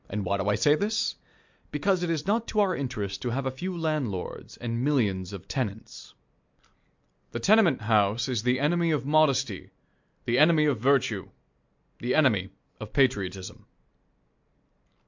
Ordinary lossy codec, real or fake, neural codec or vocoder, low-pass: MP3, 64 kbps; real; none; 7.2 kHz